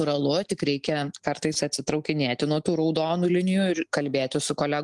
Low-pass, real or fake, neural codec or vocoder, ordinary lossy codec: 10.8 kHz; real; none; Opus, 24 kbps